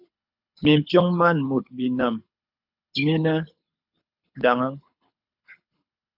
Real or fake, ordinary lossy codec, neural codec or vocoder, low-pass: fake; AAC, 48 kbps; codec, 24 kHz, 6 kbps, HILCodec; 5.4 kHz